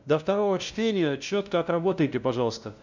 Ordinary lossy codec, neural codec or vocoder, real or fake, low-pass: none; codec, 16 kHz, 0.5 kbps, FunCodec, trained on LibriTTS, 25 frames a second; fake; 7.2 kHz